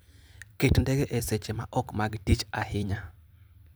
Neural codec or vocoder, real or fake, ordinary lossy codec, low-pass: vocoder, 44.1 kHz, 128 mel bands every 256 samples, BigVGAN v2; fake; none; none